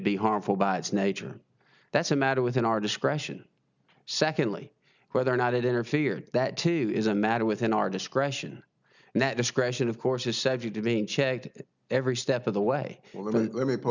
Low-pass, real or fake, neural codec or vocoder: 7.2 kHz; real; none